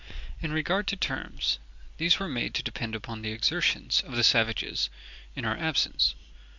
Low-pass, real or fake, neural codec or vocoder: 7.2 kHz; real; none